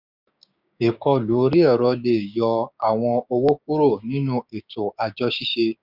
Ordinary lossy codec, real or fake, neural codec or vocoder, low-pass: none; fake; codec, 16 kHz, 6 kbps, DAC; 5.4 kHz